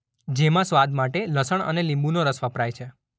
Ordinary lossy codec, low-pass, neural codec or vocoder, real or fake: none; none; none; real